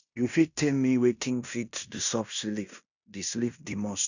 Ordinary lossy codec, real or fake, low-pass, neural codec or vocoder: none; fake; 7.2 kHz; codec, 16 kHz in and 24 kHz out, 0.9 kbps, LongCat-Audio-Codec, fine tuned four codebook decoder